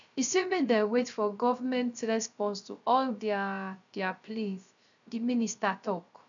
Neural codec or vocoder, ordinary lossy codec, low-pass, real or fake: codec, 16 kHz, 0.3 kbps, FocalCodec; none; 7.2 kHz; fake